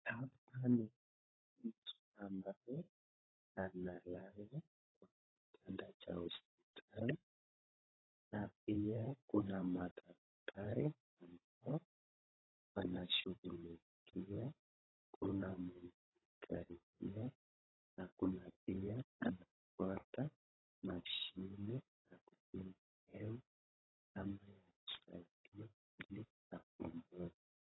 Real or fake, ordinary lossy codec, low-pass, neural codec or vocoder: fake; AAC, 16 kbps; 7.2 kHz; codec, 16 kHz, 16 kbps, FunCodec, trained on Chinese and English, 50 frames a second